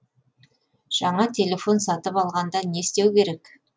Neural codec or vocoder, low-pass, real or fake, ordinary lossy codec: none; none; real; none